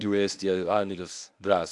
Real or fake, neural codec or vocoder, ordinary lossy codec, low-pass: fake; codec, 24 kHz, 0.9 kbps, WavTokenizer, medium speech release version 1; AAC, 64 kbps; 10.8 kHz